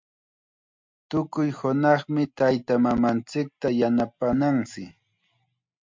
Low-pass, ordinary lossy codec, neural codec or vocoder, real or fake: 7.2 kHz; MP3, 64 kbps; none; real